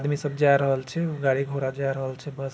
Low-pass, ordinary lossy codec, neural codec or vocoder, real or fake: none; none; none; real